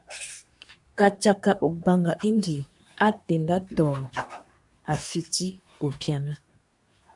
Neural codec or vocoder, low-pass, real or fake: codec, 24 kHz, 1 kbps, SNAC; 10.8 kHz; fake